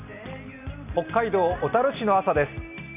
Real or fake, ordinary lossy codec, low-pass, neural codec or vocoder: real; none; 3.6 kHz; none